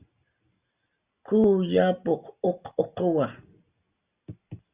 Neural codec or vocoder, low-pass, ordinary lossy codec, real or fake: none; 3.6 kHz; Opus, 64 kbps; real